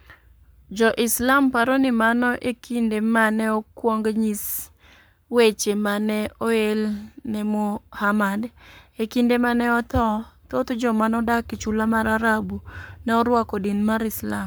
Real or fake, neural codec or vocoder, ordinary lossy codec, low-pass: fake; codec, 44.1 kHz, 7.8 kbps, Pupu-Codec; none; none